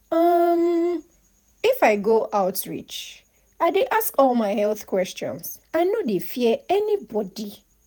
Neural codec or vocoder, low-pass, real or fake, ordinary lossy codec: vocoder, 48 kHz, 128 mel bands, Vocos; none; fake; none